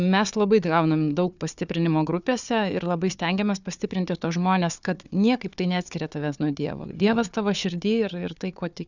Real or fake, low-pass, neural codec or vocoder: fake; 7.2 kHz; codec, 16 kHz, 4 kbps, FunCodec, trained on Chinese and English, 50 frames a second